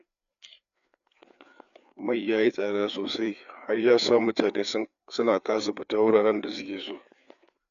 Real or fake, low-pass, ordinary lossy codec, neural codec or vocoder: fake; 7.2 kHz; none; codec, 16 kHz, 4 kbps, FreqCodec, larger model